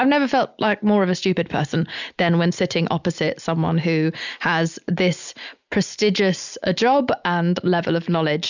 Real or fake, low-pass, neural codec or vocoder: real; 7.2 kHz; none